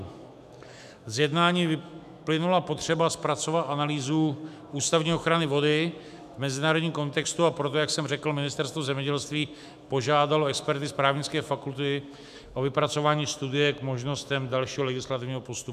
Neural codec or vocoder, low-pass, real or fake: autoencoder, 48 kHz, 128 numbers a frame, DAC-VAE, trained on Japanese speech; 14.4 kHz; fake